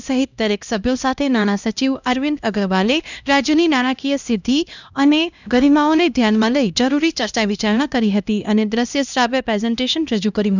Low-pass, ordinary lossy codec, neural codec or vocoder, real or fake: 7.2 kHz; none; codec, 16 kHz, 1 kbps, X-Codec, HuBERT features, trained on LibriSpeech; fake